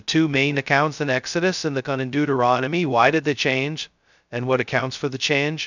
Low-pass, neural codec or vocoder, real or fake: 7.2 kHz; codec, 16 kHz, 0.2 kbps, FocalCodec; fake